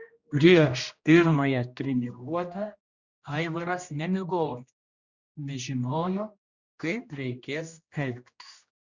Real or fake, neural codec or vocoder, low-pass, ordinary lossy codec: fake; codec, 16 kHz, 1 kbps, X-Codec, HuBERT features, trained on general audio; 7.2 kHz; Opus, 64 kbps